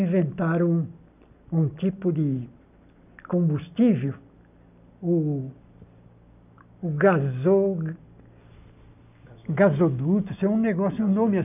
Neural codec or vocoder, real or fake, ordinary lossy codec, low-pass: none; real; none; 3.6 kHz